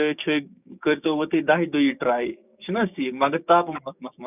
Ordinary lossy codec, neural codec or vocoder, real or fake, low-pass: none; codec, 16 kHz, 6 kbps, DAC; fake; 3.6 kHz